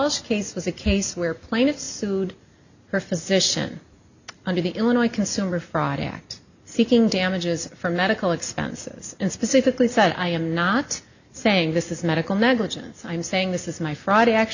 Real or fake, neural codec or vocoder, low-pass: real; none; 7.2 kHz